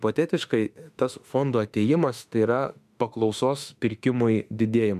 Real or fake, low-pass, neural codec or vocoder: fake; 14.4 kHz; autoencoder, 48 kHz, 32 numbers a frame, DAC-VAE, trained on Japanese speech